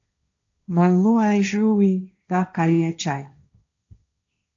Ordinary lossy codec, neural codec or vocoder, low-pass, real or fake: AAC, 64 kbps; codec, 16 kHz, 1.1 kbps, Voila-Tokenizer; 7.2 kHz; fake